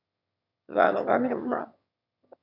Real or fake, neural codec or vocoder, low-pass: fake; autoencoder, 22.05 kHz, a latent of 192 numbers a frame, VITS, trained on one speaker; 5.4 kHz